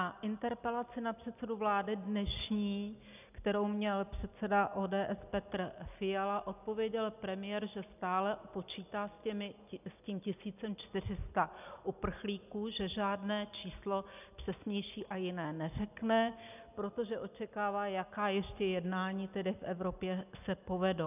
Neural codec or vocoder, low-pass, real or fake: none; 3.6 kHz; real